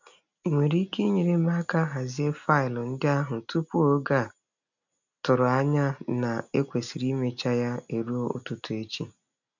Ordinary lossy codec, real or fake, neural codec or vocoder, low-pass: none; real; none; 7.2 kHz